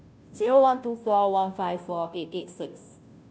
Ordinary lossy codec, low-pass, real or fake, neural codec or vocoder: none; none; fake; codec, 16 kHz, 0.5 kbps, FunCodec, trained on Chinese and English, 25 frames a second